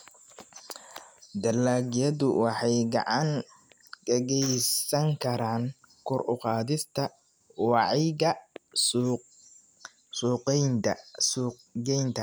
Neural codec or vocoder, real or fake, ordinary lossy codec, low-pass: none; real; none; none